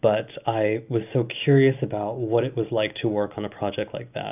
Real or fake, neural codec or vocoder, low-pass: real; none; 3.6 kHz